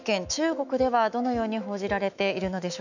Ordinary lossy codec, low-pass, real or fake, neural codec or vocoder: none; 7.2 kHz; fake; autoencoder, 48 kHz, 128 numbers a frame, DAC-VAE, trained on Japanese speech